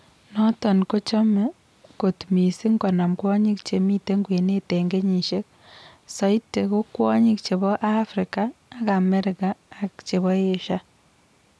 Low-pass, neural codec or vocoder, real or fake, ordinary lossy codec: none; none; real; none